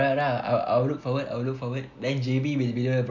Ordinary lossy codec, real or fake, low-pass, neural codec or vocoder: none; real; 7.2 kHz; none